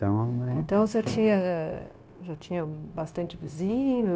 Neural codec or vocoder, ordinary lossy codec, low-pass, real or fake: codec, 16 kHz, 0.9 kbps, LongCat-Audio-Codec; none; none; fake